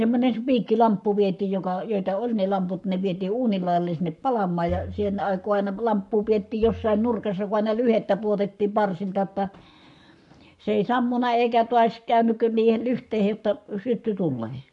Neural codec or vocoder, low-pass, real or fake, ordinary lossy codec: codec, 44.1 kHz, 7.8 kbps, Pupu-Codec; 10.8 kHz; fake; none